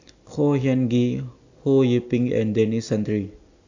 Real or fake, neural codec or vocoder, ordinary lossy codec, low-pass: real; none; AAC, 48 kbps; 7.2 kHz